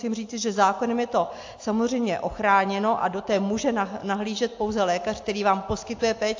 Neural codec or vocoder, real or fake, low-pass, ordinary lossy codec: none; real; 7.2 kHz; AAC, 48 kbps